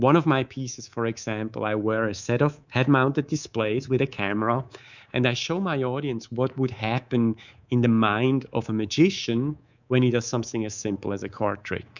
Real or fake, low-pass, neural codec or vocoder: fake; 7.2 kHz; codec, 24 kHz, 3.1 kbps, DualCodec